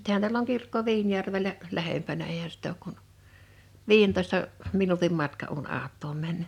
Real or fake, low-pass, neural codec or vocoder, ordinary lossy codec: real; 19.8 kHz; none; none